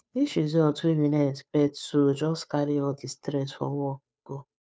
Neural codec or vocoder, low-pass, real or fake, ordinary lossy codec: codec, 16 kHz, 2 kbps, FunCodec, trained on Chinese and English, 25 frames a second; none; fake; none